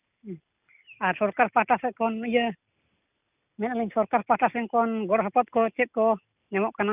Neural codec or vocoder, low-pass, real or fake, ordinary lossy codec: none; 3.6 kHz; real; none